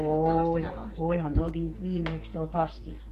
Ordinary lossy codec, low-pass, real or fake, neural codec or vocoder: AAC, 48 kbps; 14.4 kHz; fake; codec, 44.1 kHz, 2.6 kbps, SNAC